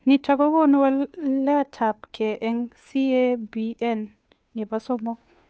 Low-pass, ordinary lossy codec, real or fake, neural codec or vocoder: none; none; fake; codec, 16 kHz, 2 kbps, FunCodec, trained on Chinese and English, 25 frames a second